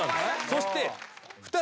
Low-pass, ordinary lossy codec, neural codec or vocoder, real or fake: none; none; none; real